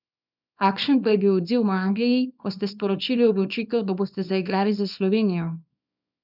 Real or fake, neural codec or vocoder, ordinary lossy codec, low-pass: fake; codec, 24 kHz, 0.9 kbps, WavTokenizer, small release; none; 5.4 kHz